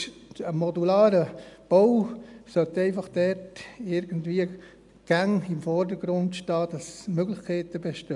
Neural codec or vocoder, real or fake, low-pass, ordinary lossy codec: none; real; 10.8 kHz; none